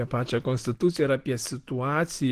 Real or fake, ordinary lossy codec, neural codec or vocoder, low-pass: real; Opus, 16 kbps; none; 14.4 kHz